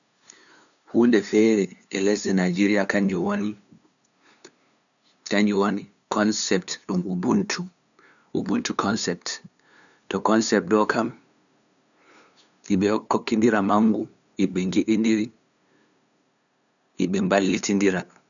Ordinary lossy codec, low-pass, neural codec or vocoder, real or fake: none; 7.2 kHz; codec, 16 kHz, 2 kbps, FunCodec, trained on LibriTTS, 25 frames a second; fake